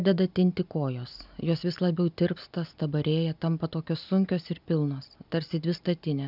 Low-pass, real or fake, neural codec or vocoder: 5.4 kHz; real; none